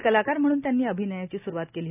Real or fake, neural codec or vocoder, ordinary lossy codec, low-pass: real; none; none; 3.6 kHz